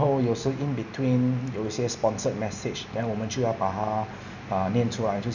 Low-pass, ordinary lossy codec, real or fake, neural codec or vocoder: 7.2 kHz; none; real; none